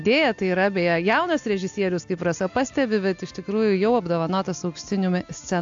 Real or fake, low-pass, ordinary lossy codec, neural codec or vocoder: real; 7.2 kHz; AAC, 64 kbps; none